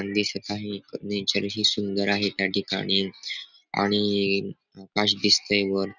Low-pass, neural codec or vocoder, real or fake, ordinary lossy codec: 7.2 kHz; none; real; none